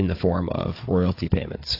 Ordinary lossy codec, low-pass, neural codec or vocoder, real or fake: AAC, 32 kbps; 5.4 kHz; codec, 16 kHz, 16 kbps, FunCodec, trained on Chinese and English, 50 frames a second; fake